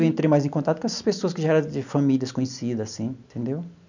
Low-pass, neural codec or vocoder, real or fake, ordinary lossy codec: 7.2 kHz; none; real; none